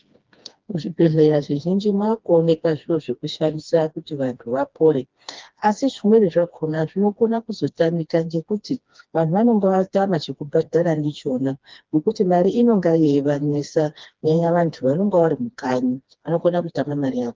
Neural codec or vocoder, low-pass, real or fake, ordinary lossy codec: codec, 16 kHz, 2 kbps, FreqCodec, smaller model; 7.2 kHz; fake; Opus, 32 kbps